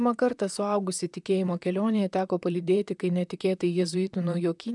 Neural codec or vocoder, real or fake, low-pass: vocoder, 44.1 kHz, 128 mel bands, Pupu-Vocoder; fake; 10.8 kHz